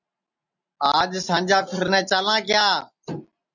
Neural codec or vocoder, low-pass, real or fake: none; 7.2 kHz; real